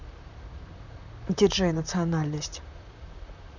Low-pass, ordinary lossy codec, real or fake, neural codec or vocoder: 7.2 kHz; none; fake; vocoder, 44.1 kHz, 128 mel bands, Pupu-Vocoder